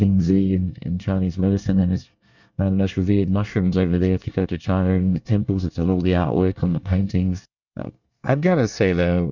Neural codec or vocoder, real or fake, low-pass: codec, 24 kHz, 1 kbps, SNAC; fake; 7.2 kHz